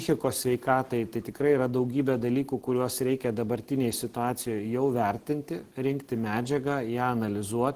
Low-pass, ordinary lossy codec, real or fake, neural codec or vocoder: 14.4 kHz; Opus, 16 kbps; real; none